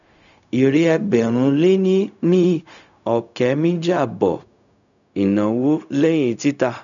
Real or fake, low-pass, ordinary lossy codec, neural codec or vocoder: fake; 7.2 kHz; none; codec, 16 kHz, 0.4 kbps, LongCat-Audio-Codec